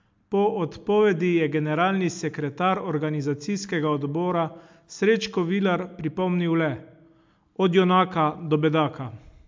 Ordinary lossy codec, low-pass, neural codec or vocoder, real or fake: MP3, 64 kbps; 7.2 kHz; none; real